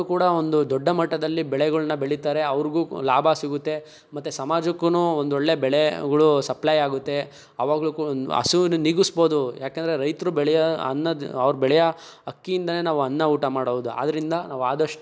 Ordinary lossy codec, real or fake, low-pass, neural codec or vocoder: none; real; none; none